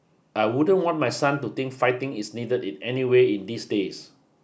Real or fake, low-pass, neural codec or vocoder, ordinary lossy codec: real; none; none; none